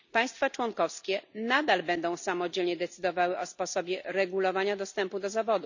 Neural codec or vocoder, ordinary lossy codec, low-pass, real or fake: none; none; 7.2 kHz; real